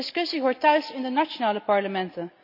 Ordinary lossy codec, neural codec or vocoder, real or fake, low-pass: none; none; real; 5.4 kHz